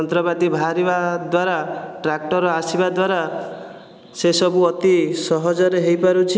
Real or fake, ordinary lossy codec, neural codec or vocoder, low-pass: real; none; none; none